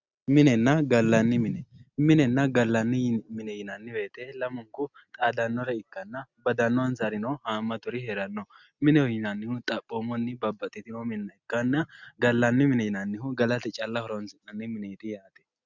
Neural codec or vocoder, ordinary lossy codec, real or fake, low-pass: none; Opus, 64 kbps; real; 7.2 kHz